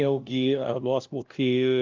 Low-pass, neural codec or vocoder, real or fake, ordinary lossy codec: 7.2 kHz; codec, 16 kHz, 0.5 kbps, FunCodec, trained on LibriTTS, 25 frames a second; fake; Opus, 32 kbps